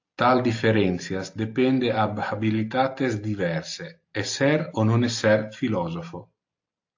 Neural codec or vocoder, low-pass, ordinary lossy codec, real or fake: none; 7.2 kHz; AAC, 48 kbps; real